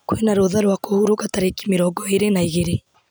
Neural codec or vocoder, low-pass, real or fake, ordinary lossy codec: none; none; real; none